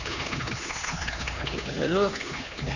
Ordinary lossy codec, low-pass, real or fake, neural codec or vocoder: none; 7.2 kHz; fake; codec, 16 kHz, 2 kbps, X-Codec, HuBERT features, trained on LibriSpeech